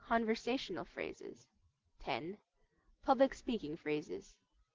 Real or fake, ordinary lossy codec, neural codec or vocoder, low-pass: real; Opus, 16 kbps; none; 7.2 kHz